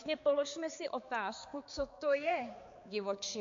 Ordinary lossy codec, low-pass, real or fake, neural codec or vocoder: MP3, 48 kbps; 7.2 kHz; fake; codec, 16 kHz, 4 kbps, X-Codec, HuBERT features, trained on balanced general audio